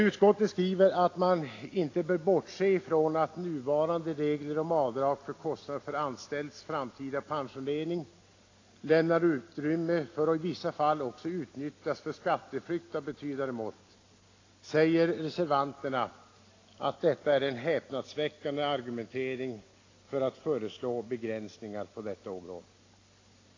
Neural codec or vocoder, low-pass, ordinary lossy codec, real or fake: none; 7.2 kHz; AAC, 32 kbps; real